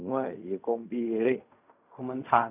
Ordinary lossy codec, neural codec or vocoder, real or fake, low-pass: none; codec, 16 kHz in and 24 kHz out, 0.4 kbps, LongCat-Audio-Codec, fine tuned four codebook decoder; fake; 3.6 kHz